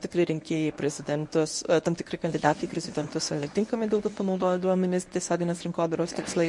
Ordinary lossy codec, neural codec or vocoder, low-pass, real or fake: MP3, 48 kbps; codec, 24 kHz, 0.9 kbps, WavTokenizer, medium speech release version 1; 10.8 kHz; fake